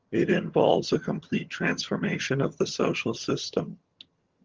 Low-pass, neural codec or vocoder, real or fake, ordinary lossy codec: 7.2 kHz; vocoder, 22.05 kHz, 80 mel bands, HiFi-GAN; fake; Opus, 16 kbps